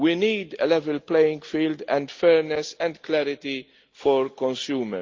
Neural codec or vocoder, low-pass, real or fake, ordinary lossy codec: none; 7.2 kHz; real; Opus, 24 kbps